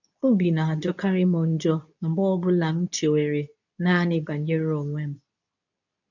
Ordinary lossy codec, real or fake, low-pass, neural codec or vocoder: none; fake; 7.2 kHz; codec, 24 kHz, 0.9 kbps, WavTokenizer, medium speech release version 2